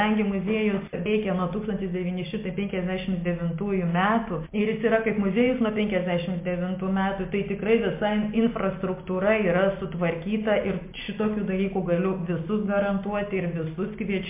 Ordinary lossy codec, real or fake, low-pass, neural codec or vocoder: MP3, 24 kbps; real; 3.6 kHz; none